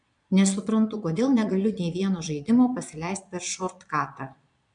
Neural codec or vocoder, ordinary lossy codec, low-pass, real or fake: vocoder, 22.05 kHz, 80 mel bands, Vocos; MP3, 96 kbps; 9.9 kHz; fake